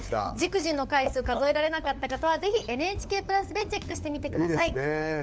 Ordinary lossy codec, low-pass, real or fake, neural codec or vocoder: none; none; fake; codec, 16 kHz, 4 kbps, FunCodec, trained on LibriTTS, 50 frames a second